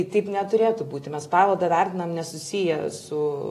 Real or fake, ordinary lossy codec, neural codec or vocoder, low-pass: real; AAC, 48 kbps; none; 14.4 kHz